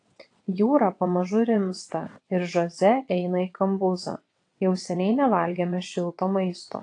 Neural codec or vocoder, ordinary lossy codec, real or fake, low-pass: vocoder, 22.05 kHz, 80 mel bands, WaveNeXt; AAC, 48 kbps; fake; 9.9 kHz